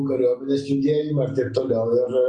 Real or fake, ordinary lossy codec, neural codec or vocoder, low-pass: real; Opus, 64 kbps; none; 9.9 kHz